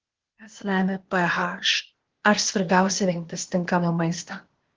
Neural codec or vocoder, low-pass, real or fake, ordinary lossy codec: codec, 16 kHz, 0.8 kbps, ZipCodec; 7.2 kHz; fake; Opus, 16 kbps